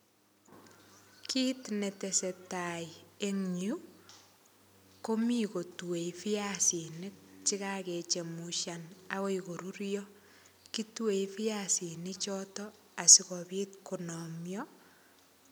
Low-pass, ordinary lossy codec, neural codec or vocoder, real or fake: none; none; none; real